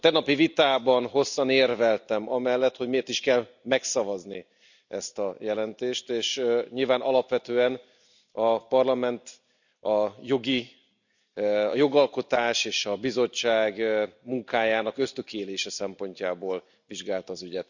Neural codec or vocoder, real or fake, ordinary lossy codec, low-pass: none; real; none; 7.2 kHz